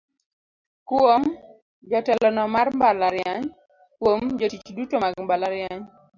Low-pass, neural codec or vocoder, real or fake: 7.2 kHz; none; real